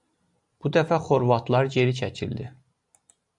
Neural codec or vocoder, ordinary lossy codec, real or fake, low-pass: none; MP3, 96 kbps; real; 10.8 kHz